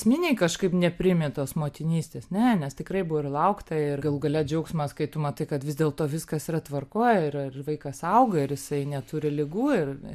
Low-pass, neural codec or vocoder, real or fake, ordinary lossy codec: 14.4 kHz; none; real; MP3, 96 kbps